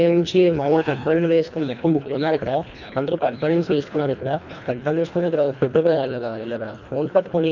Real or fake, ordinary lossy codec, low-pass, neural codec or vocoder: fake; none; 7.2 kHz; codec, 24 kHz, 1.5 kbps, HILCodec